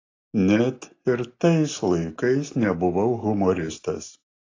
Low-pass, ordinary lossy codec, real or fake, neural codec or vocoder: 7.2 kHz; AAC, 32 kbps; real; none